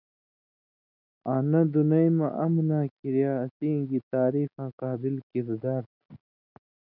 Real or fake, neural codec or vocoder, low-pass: real; none; 5.4 kHz